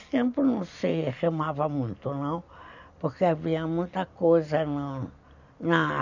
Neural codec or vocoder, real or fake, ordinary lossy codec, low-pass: none; real; none; 7.2 kHz